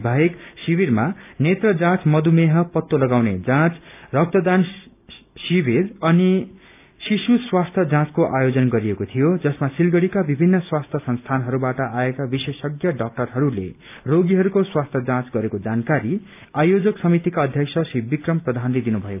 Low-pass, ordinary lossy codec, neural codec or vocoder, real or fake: 3.6 kHz; none; none; real